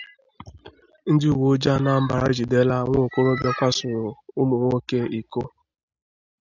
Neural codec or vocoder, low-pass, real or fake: none; 7.2 kHz; real